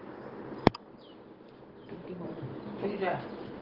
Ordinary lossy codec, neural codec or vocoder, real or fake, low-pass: Opus, 16 kbps; none; real; 5.4 kHz